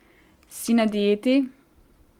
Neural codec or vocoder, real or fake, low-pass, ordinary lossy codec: none; real; 19.8 kHz; Opus, 24 kbps